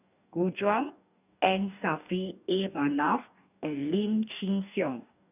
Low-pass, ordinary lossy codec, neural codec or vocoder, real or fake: 3.6 kHz; none; codec, 44.1 kHz, 2.6 kbps, DAC; fake